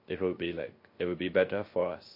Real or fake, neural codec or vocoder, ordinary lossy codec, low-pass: fake; codec, 16 kHz, 0.3 kbps, FocalCodec; MP3, 32 kbps; 5.4 kHz